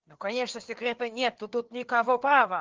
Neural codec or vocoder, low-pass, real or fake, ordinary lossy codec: codec, 16 kHz, 4 kbps, FreqCodec, larger model; 7.2 kHz; fake; Opus, 16 kbps